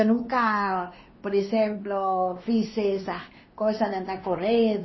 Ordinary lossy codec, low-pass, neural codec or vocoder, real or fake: MP3, 24 kbps; 7.2 kHz; codec, 16 kHz, 2 kbps, X-Codec, WavLM features, trained on Multilingual LibriSpeech; fake